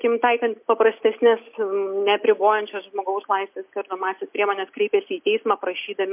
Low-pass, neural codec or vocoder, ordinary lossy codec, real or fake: 3.6 kHz; none; MP3, 32 kbps; real